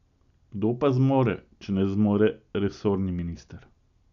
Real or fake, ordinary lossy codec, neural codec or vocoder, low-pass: real; none; none; 7.2 kHz